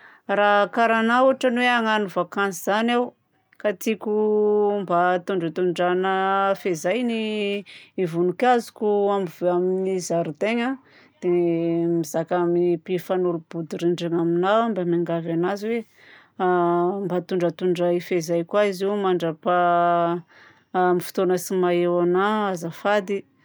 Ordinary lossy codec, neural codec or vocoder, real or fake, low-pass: none; none; real; none